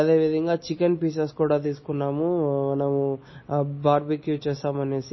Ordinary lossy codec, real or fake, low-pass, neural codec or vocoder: MP3, 24 kbps; real; 7.2 kHz; none